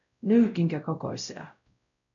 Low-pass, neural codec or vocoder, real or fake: 7.2 kHz; codec, 16 kHz, 0.5 kbps, X-Codec, WavLM features, trained on Multilingual LibriSpeech; fake